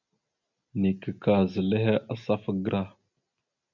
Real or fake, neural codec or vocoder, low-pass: real; none; 7.2 kHz